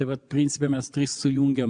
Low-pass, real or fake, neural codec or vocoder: 9.9 kHz; fake; vocoder, 22.05 kHz, 80 mel bands, WaveNeXt